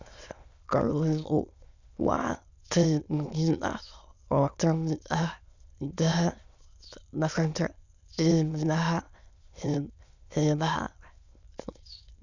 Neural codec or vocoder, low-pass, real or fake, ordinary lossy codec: autoencoder, 22.05 kHz, a latent of 192 numbers a frame, VITS, trained on many speakers; 7.2 kHz; fake; none